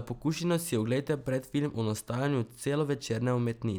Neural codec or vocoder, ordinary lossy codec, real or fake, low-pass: none; none; real; none